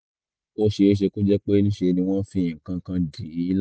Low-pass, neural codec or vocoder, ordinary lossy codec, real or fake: none; none; none; real